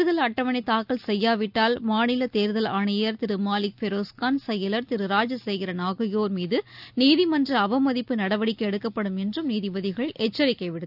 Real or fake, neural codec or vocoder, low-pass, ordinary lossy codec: real; none; 5.4 kHz; none